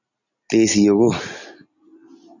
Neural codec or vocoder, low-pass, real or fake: none; 7.2 kHz; real